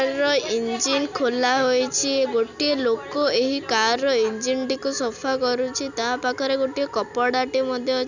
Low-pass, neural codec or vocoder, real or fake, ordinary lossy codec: 7.2 kHz; none; real; none